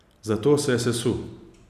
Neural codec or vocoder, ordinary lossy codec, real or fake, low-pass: none; none; real; 14.4 kHz